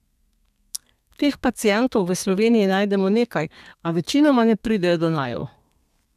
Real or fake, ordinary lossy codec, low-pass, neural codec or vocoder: fake; none; 14.4 kHz; codec, 32 kHz, 1.9 kbps, SNAC